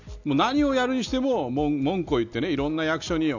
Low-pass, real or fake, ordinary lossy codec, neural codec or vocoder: 7.2 kHz; real; none; none